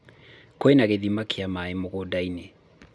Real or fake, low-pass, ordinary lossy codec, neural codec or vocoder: real; none; none; none